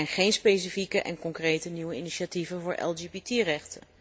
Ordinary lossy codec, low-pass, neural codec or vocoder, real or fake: none; none; none; real